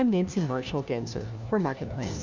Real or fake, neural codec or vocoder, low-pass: fake; codec, 16 kHz, 1 kbps, FunCodec, trained on LibriTTS, 50 frames a second; 7.2 kHz